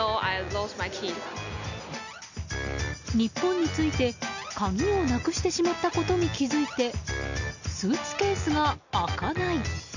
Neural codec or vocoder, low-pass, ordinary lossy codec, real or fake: none; 7.2 kHz; none; real